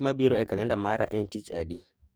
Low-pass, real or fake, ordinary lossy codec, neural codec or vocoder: none; fake; none; codec, 44.1 kHz, 2.6 kbps, DAC